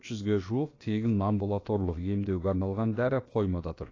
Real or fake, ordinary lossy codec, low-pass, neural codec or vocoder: fake; AAC, 32 kbps; 7.2 kHz; codec, 16 kHz, about 1 kbps, DyCAST, with the encoder's durations